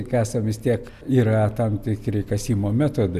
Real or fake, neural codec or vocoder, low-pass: real; none; 14.4 kHz